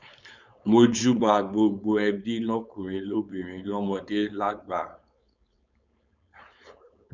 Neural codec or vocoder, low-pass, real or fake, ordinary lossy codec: codec, 16 kHz, 4.8 kbps, FACodec; 7.2 kHz; fake; none